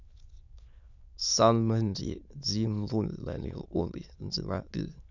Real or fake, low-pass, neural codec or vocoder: fake; 7.2 kHz; autoencoder, 22.05 kHz, a latent of 192 numbers a frame, VITS, trained on many speakers